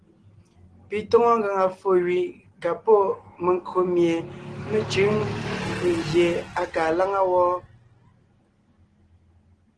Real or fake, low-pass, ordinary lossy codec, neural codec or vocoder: real; 9.9 kHz; Opus, 16 kbps; none